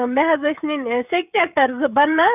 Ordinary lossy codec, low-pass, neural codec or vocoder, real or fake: none; 3.6 kHz; codec, 16 kHz, 16 kbps, FreqCodec, smaller model; fake